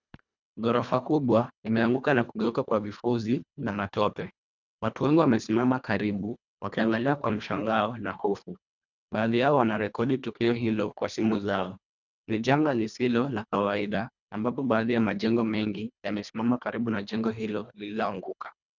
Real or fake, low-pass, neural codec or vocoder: fake; 7.2 kHz; codec, 24 kHz, 1.5 kbps, HILCodec